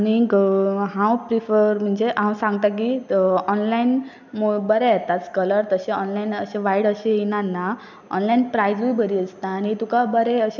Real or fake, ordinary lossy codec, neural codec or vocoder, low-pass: real; none; none; 7.2 kHz